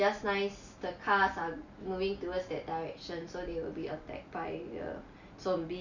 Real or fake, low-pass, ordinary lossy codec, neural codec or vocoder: real; 7.2 kHz; none; none